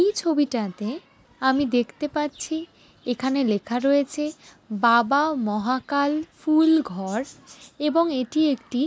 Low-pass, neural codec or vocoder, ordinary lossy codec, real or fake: none; none; none; real